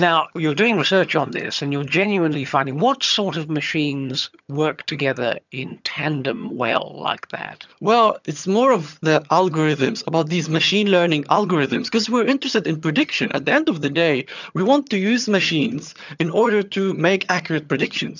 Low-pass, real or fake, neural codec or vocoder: 7.2 kHz; fake; vocoder, 22.05 kHz, 80 mel bands, HiFi-GAN